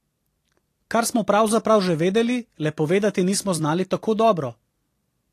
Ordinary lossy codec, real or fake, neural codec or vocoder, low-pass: AAC, 48 kbps; fake; vocoder, 44.1 kHz, 128 mel bands every 256 samples, BigVGAN v2; 14.4 kHz